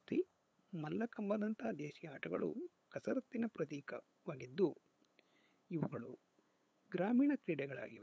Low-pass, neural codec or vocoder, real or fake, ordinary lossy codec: none; codec, 16 kHz, 8 kbps, FunCodec, trained on LibriTTS, 25 frames a second; fake; none